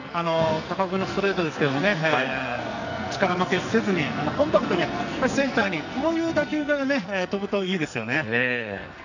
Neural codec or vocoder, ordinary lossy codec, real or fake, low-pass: codec, 44.1 kHz, 2.6 kbps, SNAC; none; fake; 7.2 kHz